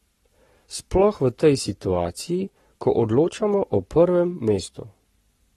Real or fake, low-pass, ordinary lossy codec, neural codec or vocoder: fake; 19.8 kHz; AAC, 32 kbps; codec, 44.1 kHz, 7.8 kbps, Pupu-Codec